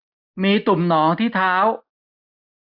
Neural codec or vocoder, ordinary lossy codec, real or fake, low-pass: none; AAC, 48 kbps; real; 5.4 kHz